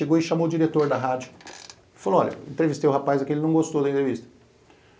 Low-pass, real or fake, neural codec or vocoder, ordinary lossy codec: none; real; none; none